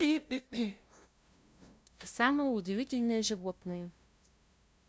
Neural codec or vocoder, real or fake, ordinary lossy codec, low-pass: codec, 16 kHz, 0.5 kbps, FunCodec, trained on LibriTTS, 25 frames a second; fake; none; none